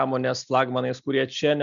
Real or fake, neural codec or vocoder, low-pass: real; none; 7.2 kHz